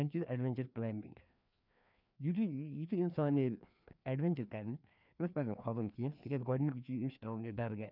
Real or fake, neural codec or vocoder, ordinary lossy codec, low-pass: fake; codec, 16 kHz, 2 kbps, FreqCodec, larger model; none; 5.4 kHz